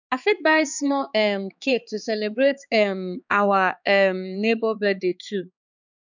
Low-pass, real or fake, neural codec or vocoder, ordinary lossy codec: 7.2 kHz; fake; codec, 16 kHz, 4 kbps, X-Codec, HuBERT features, trained on balanced general audio; none